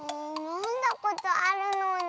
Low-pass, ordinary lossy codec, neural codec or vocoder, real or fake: none; none; none; real